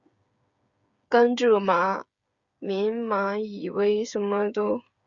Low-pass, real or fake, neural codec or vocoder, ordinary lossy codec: 7.2 kHz; fake; codec, 16 kHz, 8 kbps, FreqCodec, smaller model; Opus, 64 kbps